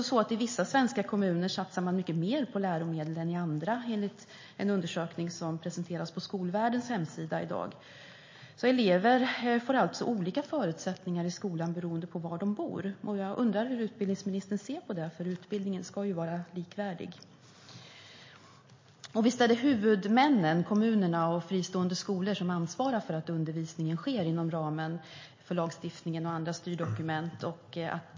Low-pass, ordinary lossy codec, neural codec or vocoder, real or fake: 7.2 kHz; MP3, 32 kbps; none; real